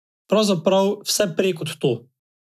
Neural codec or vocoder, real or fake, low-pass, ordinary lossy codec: none; real; 14.4 kHz; none